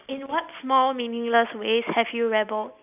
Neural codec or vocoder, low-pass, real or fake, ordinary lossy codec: none; 3.6 kHz; real; none